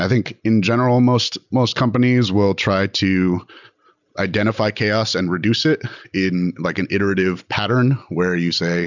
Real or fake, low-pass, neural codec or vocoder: real; 7.2 kHz; none